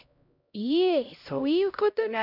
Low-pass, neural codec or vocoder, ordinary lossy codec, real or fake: 5.4 kHz; codec, 16 kHz, 0.5 kbps, X-Codec, HuBERT features, trained on LibriSpeech; none; fake